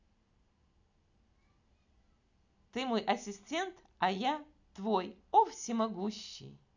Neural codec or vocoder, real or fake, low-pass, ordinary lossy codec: none; real; 7.2 kHz; none